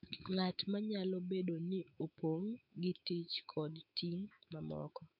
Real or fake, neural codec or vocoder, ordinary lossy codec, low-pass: fake; autoencoder, 48 kHz, 128 numbers a frame, DAC-VAE, trained on Japanese speech; MP3, 48 kbps; 5.4 kHz